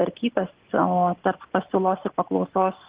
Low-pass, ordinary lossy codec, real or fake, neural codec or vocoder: 3.6 kHz; Opus, 16 kbps; real; none